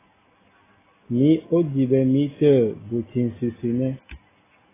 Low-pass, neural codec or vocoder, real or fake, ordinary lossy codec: 3.6 kHz; none; real; AAC, 16 kbps